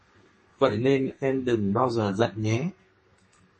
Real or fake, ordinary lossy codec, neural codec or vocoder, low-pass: fake; MP3, 32 kbps; codec, 32 kHz, 1.9 kbps, SNAC; 10.8 kHz